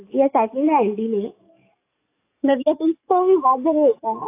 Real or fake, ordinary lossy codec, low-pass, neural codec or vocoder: fake; AAC, 24 kbps; 3.6 kHz; codec, 44.1 kHz, 7.8 kbps, DAC